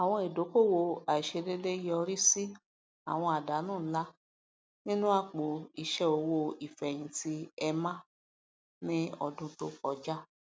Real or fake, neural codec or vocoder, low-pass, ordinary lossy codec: real; none; none; none